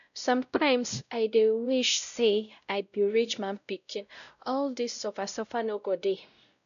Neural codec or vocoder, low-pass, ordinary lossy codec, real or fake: codec, 16 kHz, 0.5 kbps, X-Codec, WavLM features, trained on Multilingual LibriSpeech; 7.2 kHz; none; fake